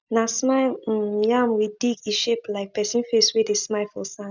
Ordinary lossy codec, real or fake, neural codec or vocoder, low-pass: none; real; none; 7.2 kHz